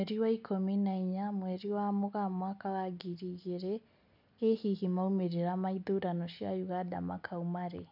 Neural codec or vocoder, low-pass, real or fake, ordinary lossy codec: none; 5.4 kHz; real; none